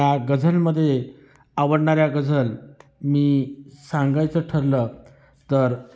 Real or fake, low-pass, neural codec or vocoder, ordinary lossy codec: real; none; none; none